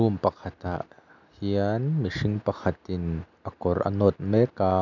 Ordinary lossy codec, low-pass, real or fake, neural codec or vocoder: none; 7.2 kHz; real; none